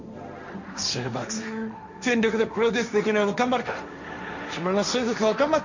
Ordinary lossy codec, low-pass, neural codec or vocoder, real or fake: none; 7.2 kHz; codec, 16 kHz, 1.1 kbps, Voila-Tokenizer; fake